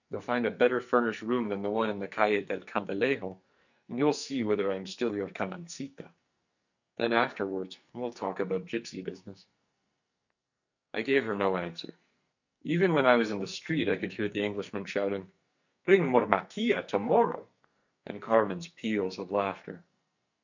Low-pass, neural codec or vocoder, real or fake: 7.2 kHz; codec, 44.1 kHz, 2.6 kbps, SNAC; fake